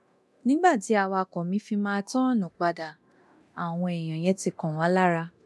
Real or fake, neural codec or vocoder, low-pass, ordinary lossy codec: fake; codec, 24 kHz, 0.9 kbps, DualCodec; none; none